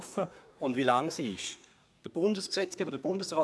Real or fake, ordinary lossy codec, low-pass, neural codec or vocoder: fake; none; none; codec, 24 kHz, 1 kbps, SNAC